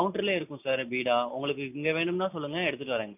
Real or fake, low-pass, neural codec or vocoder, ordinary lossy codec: real; 3.6 kHz; none; none